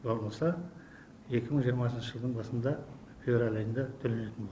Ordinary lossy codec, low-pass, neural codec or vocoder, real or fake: none; none; none; real